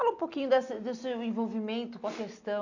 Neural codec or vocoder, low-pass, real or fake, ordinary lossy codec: none; 7.2 kHz; real; none